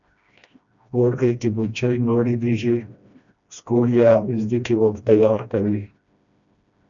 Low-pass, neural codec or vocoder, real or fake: 7.2 kHz; codec, 16 kHz, 1 kbps, FreqCodec, smaller model; fake